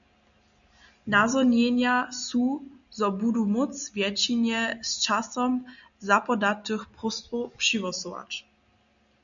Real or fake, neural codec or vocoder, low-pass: real; none; 7.2 kHz